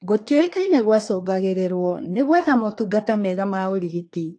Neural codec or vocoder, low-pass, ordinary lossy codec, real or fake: codec, 24 kHz, 1 kbps, SNAC; 9.9 kHz; AAC, 48 kbps; fake